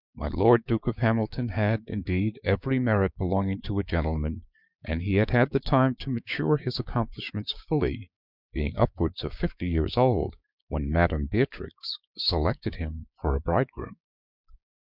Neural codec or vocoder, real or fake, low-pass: codec, 16 kHz, 6 kbps, DAC; fake; 5.4 kHz